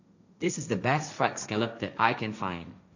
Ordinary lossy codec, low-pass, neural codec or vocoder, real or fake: none; 7.2 kHz; codec, 16 kHz, 1.1 kbps, Voila-Tokenizer; fake